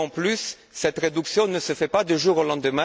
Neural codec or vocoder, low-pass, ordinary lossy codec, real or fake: none; none; none; real